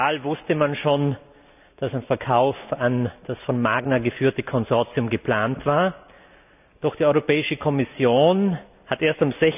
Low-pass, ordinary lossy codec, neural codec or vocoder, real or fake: 3.6 kHz; none; none; real